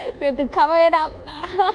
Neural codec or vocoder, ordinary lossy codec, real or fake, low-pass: codec, 24 kHz, 1.2 kbps, DualCodec; none; fake; 9.9 kHz